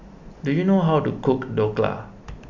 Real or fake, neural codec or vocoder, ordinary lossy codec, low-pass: real; none; none; 7.2 kHz